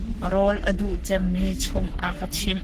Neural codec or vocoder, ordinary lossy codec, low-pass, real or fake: codec, 44.1 kHz, 3.4 kbps, Pupu-Codec; Opus, 16 kbps; 14.4 kHz; fake